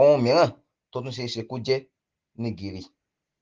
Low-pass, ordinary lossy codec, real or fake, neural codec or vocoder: 7.2 kHz; Opus, 16 kbps; real; none